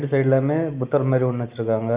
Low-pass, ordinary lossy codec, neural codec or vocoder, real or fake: 3.6 kHz; Opus, 24 kbps; none; real